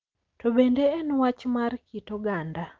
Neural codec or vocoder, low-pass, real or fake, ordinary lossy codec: none; 7.2 kHz; real; Opus, 24 kbps